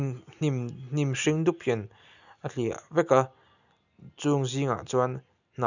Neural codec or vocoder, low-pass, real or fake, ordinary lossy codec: none; 7.2 kHz; real; none